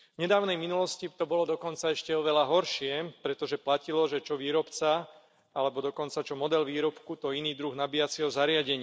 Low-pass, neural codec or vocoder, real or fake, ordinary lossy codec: none; none; real; none